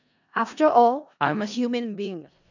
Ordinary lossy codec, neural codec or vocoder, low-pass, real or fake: none; codec, 16 kHz in and 24 kHz out, 0.4 kbps, LongCat-Audio-Codec, four codebook decoder; 7.2 kHz; fake